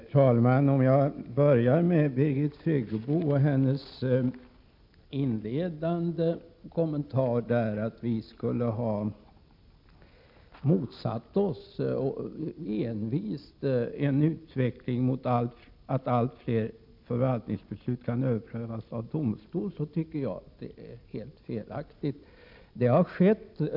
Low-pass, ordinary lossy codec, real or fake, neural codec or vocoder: 5.4 kHz; MP3, 48 kbps; real; none